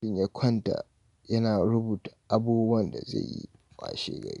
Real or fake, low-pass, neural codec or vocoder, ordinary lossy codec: real; 10.8 kHz; none; none